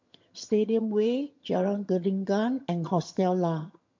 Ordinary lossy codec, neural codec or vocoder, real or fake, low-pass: MP3, 48 kbps; vocoder, 22.05 kHz, 80 mel bands, HiFi-GAN; fake; 7.2 kHz